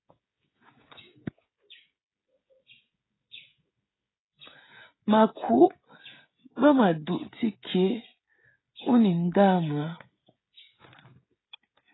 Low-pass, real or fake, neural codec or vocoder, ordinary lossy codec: 7.2 kHz; fake; codec, 16 kHz, 16 kbps, FreqCodec, smaller model; AAC, 16 kbps